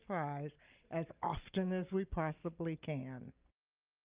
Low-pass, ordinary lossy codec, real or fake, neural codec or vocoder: 3.6 kHz; Opus, 24 kbps; real; none